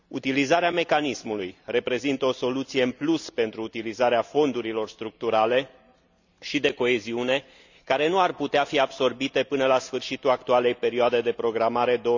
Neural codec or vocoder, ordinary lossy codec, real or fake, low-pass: none; none; real; 7.2 kHz